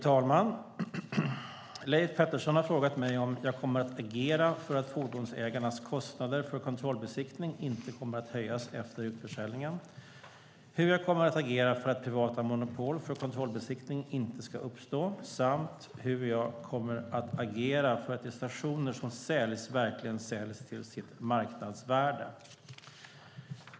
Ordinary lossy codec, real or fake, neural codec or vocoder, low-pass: none; real; none; none